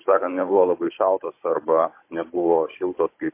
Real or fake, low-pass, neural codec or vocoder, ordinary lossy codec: fake; 3.6 kHz; codec, 16 kHz, 16 kbps, FunCodec, trained on Chinese and English, 50 frames a second; MP3, 24 kbps